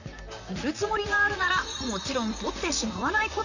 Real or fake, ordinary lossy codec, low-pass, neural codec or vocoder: fake; none; 7.2 kHz; vocoder, 44.1 kHz, 128 mel bands, Pupu-Vocoder